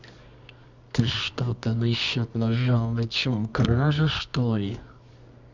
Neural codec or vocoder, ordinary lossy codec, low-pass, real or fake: codec, 24 kHz, 0.9 kbps, WavTokenizer, medium music audio release; none; 7.2 kHz; fake